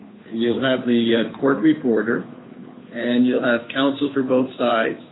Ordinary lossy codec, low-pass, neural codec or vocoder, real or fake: AAC, 16 kbps; 7.2 kHz; codec, 16 kHz, 2 kbps, FunCodec, trained on Chinese and English, 25 frames a second; fake